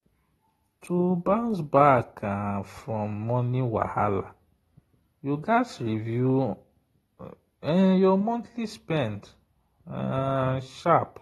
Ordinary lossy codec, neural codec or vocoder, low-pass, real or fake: AAC, 32 kbps; none; 19.8 kHz; real